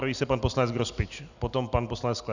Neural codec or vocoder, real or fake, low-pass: none; real; 7.2 kHz